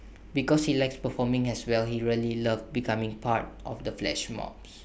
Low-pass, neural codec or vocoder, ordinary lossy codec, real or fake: none; none; none; real